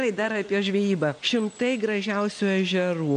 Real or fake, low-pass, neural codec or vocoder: real; 9.9 kHz; none